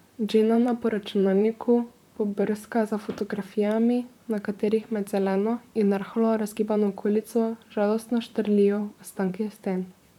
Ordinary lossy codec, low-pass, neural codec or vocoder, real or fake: none; 19.8 kHz; vocoder, 44.1 kHz, 128 mel bands, Pupu-Vocoder; fake